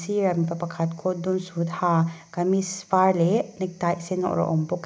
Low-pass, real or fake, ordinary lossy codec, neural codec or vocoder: none; real; none; none